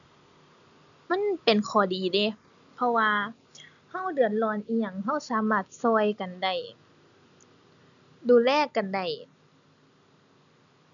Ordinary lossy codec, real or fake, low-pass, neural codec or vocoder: none; real; 7.2 kHz; none